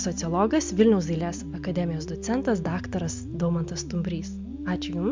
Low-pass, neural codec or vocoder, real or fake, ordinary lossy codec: 7.2 kHz; none; real; MP3, 64 kbps